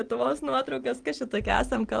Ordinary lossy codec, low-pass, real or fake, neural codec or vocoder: Opus, 24 kbps; 9.9 kHz; real; none